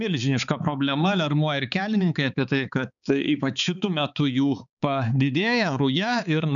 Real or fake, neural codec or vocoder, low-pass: fake; codec, 16 kHz, 4 kbps, X-Codec, HuBERT features, trained on balanced general audio; 7.2 kHz